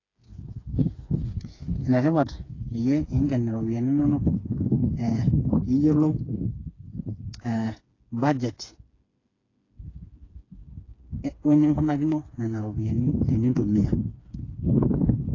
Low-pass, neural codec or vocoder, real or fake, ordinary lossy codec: 7.2 kHz; codec, 16 kHz, 4 kbps, FreqCodec, smaller model; fake; AAC, 32 kbps